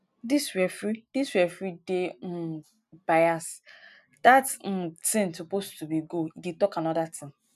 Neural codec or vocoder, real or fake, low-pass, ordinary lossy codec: none; real; 14.4 kHz; none